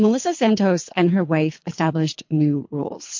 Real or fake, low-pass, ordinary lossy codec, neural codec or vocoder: fake; 7.2 kHz; MP3, 48 kbps; codec, 24 kHz, 3 kbps, HILCodec